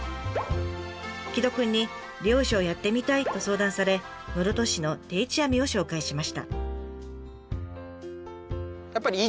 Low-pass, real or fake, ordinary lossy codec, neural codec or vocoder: none; real; none; none